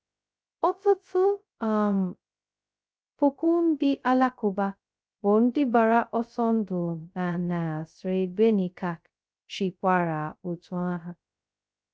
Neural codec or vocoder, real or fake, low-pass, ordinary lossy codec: codec, 16 kHz, 0.2 kbps, FocalCodec; fake; none; none